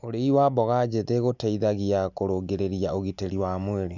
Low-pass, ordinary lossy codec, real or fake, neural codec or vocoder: 7.2 kHz; none; real; none